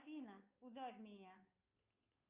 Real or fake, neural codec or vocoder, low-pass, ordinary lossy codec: fake; codec, 16 kHz, 2 kbps, FunCodec, trained on Chinese and English, 25 frames a second; 3.6 kHz; Opus, 64 kbps